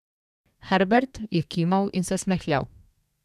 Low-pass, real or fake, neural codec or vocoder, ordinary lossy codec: 14.4 kHz; fake; codec, 32 kHz, 1.9 kbps, SNAC; none